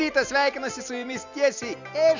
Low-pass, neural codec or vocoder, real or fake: 7.2 kHz; none; real